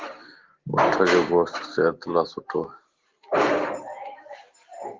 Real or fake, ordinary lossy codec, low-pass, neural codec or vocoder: real; Opus, 16 kbps; 7.2 kHz; none